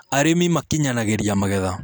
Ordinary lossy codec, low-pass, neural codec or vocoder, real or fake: none; none; none; real